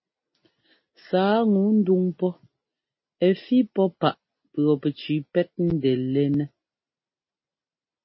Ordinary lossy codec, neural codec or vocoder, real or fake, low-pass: MP3, 24 kbps; none; real; 7.2 kHz